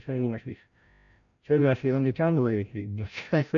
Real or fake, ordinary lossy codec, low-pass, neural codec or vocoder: fake; none; 7.2 kHz; codec, 16 kHz, 0.5 kbps, FreqCodec, larger model